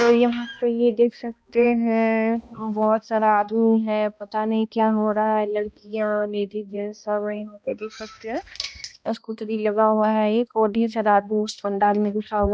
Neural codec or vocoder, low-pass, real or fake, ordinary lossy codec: codec, 16 kHz, 1 kbps, X-Codec, HuBERT features, trained on balanced general audio; none; fake; none